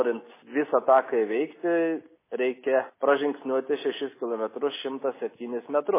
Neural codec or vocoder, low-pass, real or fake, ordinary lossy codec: none; 3.6 kHz; real; MP3, 16 kbps